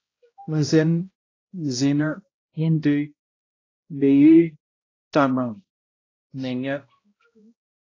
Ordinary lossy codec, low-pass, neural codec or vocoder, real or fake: AAC, 32 kbps; 7.2 kHz; codec, 16 kHz, 0.5 kbps, X-Codec, HuBERT features, trained on balanced general audio; fake